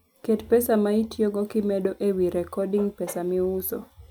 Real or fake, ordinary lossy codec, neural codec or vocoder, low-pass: real; none; none; none